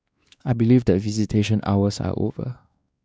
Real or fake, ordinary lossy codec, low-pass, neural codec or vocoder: fake; none; none; codec, 16 kHz, 2 kbps, X-Codec, WavLM features, trained on Multilingual LibriSpeech